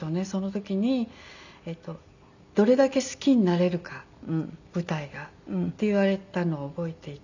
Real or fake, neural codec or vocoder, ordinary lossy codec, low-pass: real; none; none; 7.2 kHz